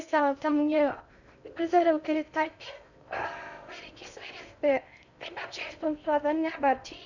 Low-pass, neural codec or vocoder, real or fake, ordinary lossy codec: 7.2 kHz; codec, 16 kHz in and 24 kHz out, 0.6 kbps, FocalCodec, streaming, 4096 codes; fake; none